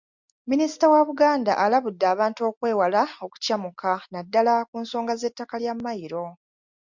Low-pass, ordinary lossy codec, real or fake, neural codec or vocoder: 7.2 kHz; MP3, 64 kbps; real; none